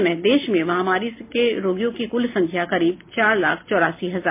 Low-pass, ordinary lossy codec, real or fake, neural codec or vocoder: 3.6 kHz; MP3, 24 kbps; real; none